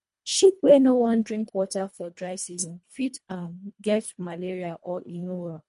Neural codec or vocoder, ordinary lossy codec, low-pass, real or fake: codec, 24 kHz, 1.5 kbps, HILCodec; MP3, 48 kbps; 10.8 kHz; fake